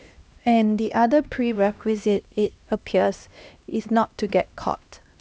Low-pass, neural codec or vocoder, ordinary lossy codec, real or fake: none; codec, 16 kHz, 1 kbps, X-Codec, HuBERT features, trained on LibriSpeech; none; fake